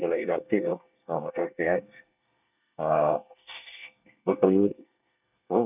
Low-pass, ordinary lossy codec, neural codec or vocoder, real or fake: 3.6 kHz; none; codec, 24 kHz, 1 kbps, SNAC; fake